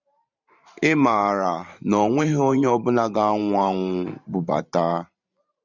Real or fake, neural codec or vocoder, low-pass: fake; vocoder, 44.1 kHz, 128 mel bands every 512 samples, BigVGAN v2; 7.2 kHz